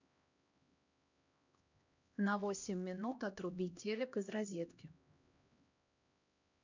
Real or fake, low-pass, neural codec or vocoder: fake; 7.2 kHz; codec, 16 kHz, 1 kbps, X-Codec, HuBERT features, trained on LibriSpeech